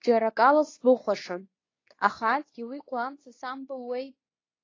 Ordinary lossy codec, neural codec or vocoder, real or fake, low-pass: AAC, 32 kbps; codec, 24 kHz, 0.9 kbps, WavTokenizer, medium speech release version 2; fake; 7.2 kHz